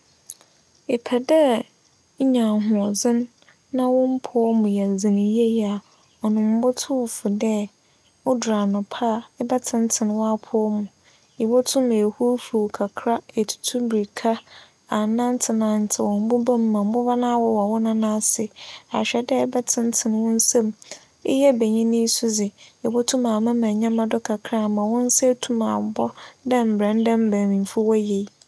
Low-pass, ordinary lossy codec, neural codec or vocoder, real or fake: none; none; none; real